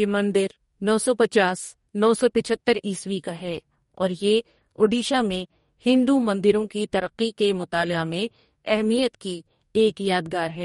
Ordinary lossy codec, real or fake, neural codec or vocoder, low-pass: MP3, 48 kbps; fake; codec, 44.1 kHz, 2.6 kbps, DAC; 19.8 kHz